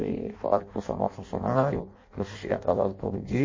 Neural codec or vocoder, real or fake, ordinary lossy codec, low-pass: codec, 16 kHz in and 24 kHz out, 0.6 kbps, FireRedTTS-2 codec; fake; MP3, 32 kbps; 7.2 kHz